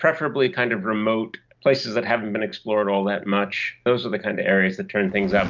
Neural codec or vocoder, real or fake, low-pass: none; real; 7.2 kHz